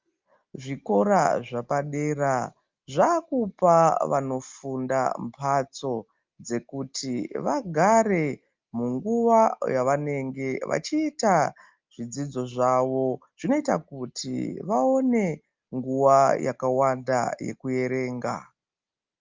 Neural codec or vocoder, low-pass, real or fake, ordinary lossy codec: none; 7.2 kHz; real; Opus, 24 kbps